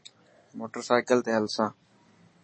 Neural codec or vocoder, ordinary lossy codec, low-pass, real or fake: none; MP3, 32 kbps; 9.9 kHz; real